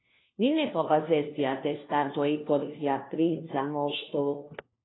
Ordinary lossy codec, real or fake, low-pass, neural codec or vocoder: AAC, 16 kbps; fake; 7.2 kHz; codec, 16 kHz, 1 kbps, FunCodec, trained on LibriTTS, 50 frames a second